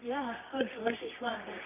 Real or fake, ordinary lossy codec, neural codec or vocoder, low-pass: fake; none; codec, 24 kHz, 0.9 kbps, WavTokenizer, medium music audio release; 3.6 kHz